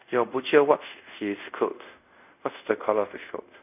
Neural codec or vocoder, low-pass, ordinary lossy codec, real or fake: codec, 24 kHz, 0.5 kbps, DualCodec; 3.6 kHz; none; fake